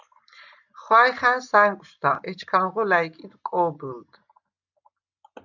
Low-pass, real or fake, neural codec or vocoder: 7.2 kHz; real; none